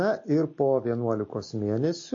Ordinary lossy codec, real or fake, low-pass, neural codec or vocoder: MP3, 32 kbps; real; 7.2 kHz; none